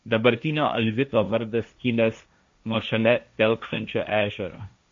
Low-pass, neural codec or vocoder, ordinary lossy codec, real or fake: 7.2 kHz; codec, 16 kHz, 1.1 kbps, Voila-Tokenizer; MP3, 48 kbps; fake